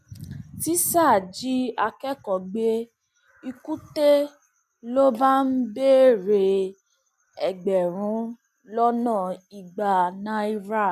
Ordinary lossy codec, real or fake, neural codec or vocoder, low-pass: none; real; none; 14.4 kHz